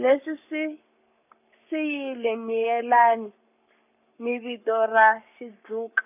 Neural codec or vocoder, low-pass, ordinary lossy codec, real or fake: codec, 44.1 kHz, 7.8 kbps, Pupu-Codec; 3.6 kHz; none; fake